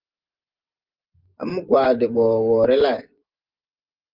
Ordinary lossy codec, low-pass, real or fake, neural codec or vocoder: Opus, 32 kbps; 5.4 kHz; fake; vocoder, 24 kHz, 100 mel bands, Vocos